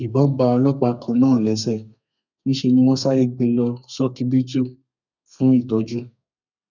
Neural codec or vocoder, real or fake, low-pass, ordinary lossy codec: codec, 32 kHz, 1.9 kbps, SNAC; fake; 7.2 kHz; none